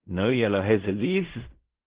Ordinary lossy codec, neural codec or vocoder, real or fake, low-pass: Opus, 16 kbps; codec, 16 kHz in and 24 kHz out, 0.4 kbps, LongCat-Audio-Codec, fine tuned four codebook decoder; fake; 3.6 kHz